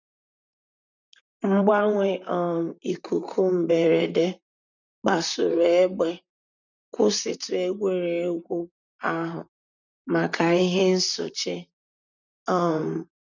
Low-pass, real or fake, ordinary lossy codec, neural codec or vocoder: 7.2 kHz; fake; none; vocoder, 44.1 kHz, 128 mel bands, Pupu-Vocoder